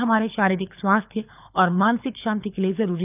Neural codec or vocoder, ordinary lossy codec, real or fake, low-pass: codec, 24 kHz, 6 kbps, HILCodec; none; fake; 3.6 kHz